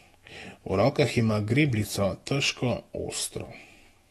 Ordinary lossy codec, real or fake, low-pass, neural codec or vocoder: AAC, 32 kbps; fake; 19.8 kHz; autoencoder, 48 kHz, 128 numbers a frame, DAC-VAE, trained on Japanese speech